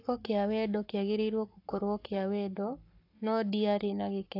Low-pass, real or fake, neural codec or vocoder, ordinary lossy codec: 5.4 kHz; fake; codec, 44.1 kHz, 7.8 kbps, Pupu-Codec; AAC, 32 kbps